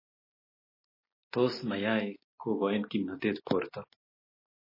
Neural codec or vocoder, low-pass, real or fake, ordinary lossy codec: none; 5.4 kHz; real; MP3, 24 kbps